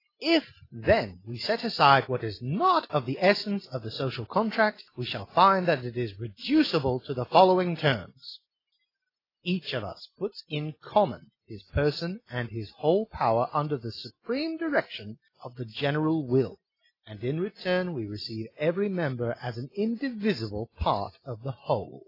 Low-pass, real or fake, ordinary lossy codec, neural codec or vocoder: 5.4 kHz; real; AAC, 24 kbps; none